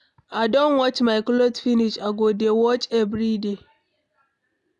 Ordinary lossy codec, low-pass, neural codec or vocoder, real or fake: none; 10.8 kHz; none; real